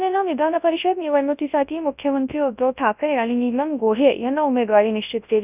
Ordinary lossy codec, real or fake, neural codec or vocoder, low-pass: none; fake; codec, 24 kHz, 0.9 kbps, WavTokenizer, large speech release; 3.6 kHz